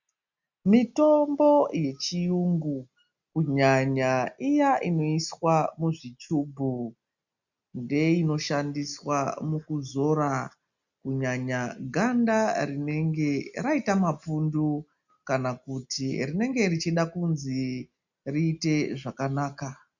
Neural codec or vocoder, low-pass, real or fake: none; 7.2 kHz; real